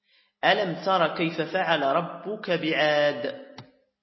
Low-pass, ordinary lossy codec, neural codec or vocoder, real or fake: 7.2 kHz; MP3, 24 kbps; none; real